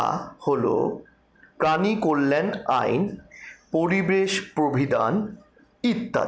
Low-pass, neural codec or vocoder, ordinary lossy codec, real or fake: none; none; none; real